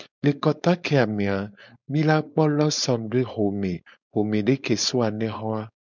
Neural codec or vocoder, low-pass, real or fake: codec, 16 kHz, 4.8 kbps, FACodec; 7.2 kHz; fake